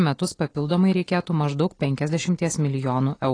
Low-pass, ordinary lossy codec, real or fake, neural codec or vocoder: 9.9 kHz; AAC, 32 kbps; real; none